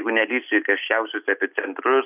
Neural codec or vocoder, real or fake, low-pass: none; real; 3.6 kHz